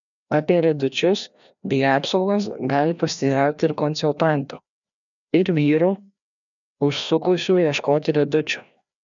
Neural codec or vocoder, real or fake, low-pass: codec, 16 kHz, 1 kbps, FreqCodec, larger model; fake; 7.2 kHz